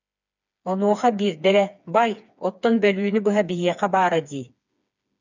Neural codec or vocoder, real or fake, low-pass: codec, 16 kHz, 4 kbps, FreqCodec, smaller model; fake; 7.2 kHz